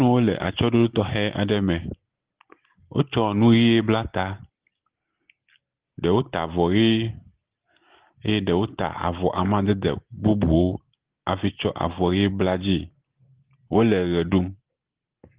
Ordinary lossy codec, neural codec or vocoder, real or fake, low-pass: Opus, 16 kbps; none; real; 3.6 kHz